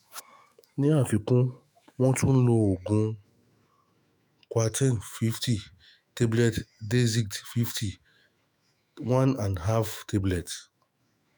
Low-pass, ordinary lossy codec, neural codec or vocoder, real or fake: none; none; autoencoder, 48 kHz, 128 numbers a frame, DAC-VAE, trained on Japanese speech; fake